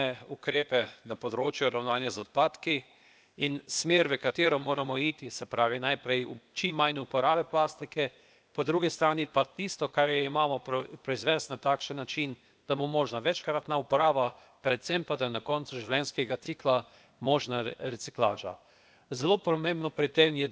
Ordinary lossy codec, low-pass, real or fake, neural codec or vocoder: none; none; fake; codec, 16 kHz, 0.8 kbps, ZipCodec